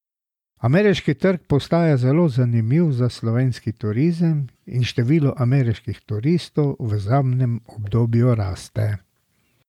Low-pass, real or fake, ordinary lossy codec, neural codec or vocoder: 19.8 kHz; real; MP3, 96 kbps; none